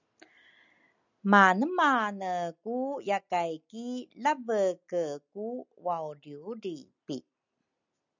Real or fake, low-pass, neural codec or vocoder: real; 7.2 kHz; none